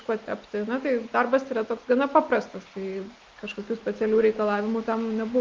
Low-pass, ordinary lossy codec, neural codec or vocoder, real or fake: 7.2 kHz; Opus, 32 kbps; none; real